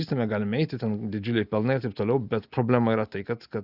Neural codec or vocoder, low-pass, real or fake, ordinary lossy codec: none; 5.4 kHz; real; Opus, 64 kbps